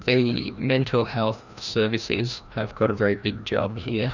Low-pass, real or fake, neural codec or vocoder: 7.2 kHz; fake; codec, 16 kHz, 1 kbps, FreqCodec, larger model